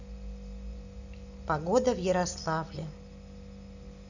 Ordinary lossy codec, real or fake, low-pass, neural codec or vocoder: none; real; 7.2 kHz; none